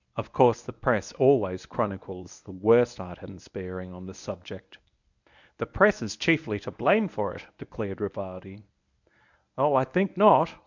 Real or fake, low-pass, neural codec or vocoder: fake; 7.2 kHz; codec, 24 kHz, 0.9 kbps, WavTokenizer, medium speech release version 1